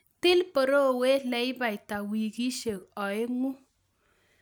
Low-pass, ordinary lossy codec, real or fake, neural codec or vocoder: none; none; real; none